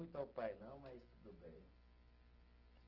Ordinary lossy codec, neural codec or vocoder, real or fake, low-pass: Opus, 16 kbps; none; real; 5.4 kHz